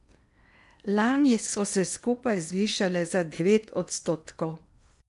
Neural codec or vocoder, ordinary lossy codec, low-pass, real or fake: codec, 16 kHz in and 24 kHz out, 0.8 kbps, FocalCodec, streaming, 65536 codes; none; 10.8 kHz; fake